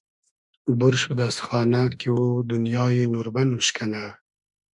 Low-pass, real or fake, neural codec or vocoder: 10.8 kHz; fake; autoencoder, 48 kHz, 32 numbers a frame, DAC-VAE, trained on Japanese speech